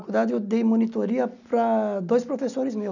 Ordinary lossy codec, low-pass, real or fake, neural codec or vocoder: none; 7.2 kHz; real; none